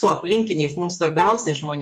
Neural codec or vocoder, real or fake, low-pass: codec, 44.1 kHz, 2.6 kbps, DAC; fake; 14.4 kHz